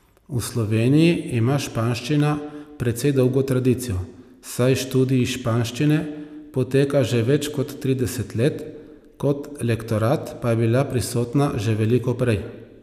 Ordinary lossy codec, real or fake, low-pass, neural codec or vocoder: none; real; 14.4 kHz; none